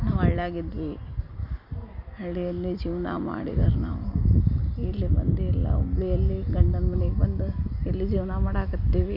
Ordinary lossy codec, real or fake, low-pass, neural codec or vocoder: none; real; 5.4 kHz; none